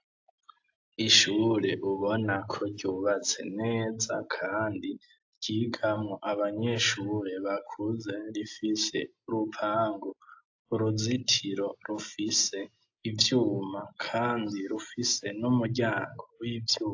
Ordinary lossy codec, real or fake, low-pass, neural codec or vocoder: AAC, 48 kbps; real; 7.2 kHz; none